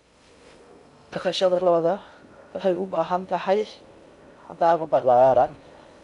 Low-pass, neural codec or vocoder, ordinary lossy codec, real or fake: 10.8 kHz; codec, 16 kHz in and 24 kHz out, 0.6 kbps, FocalCodec, streaming, 2048 codes; none; fake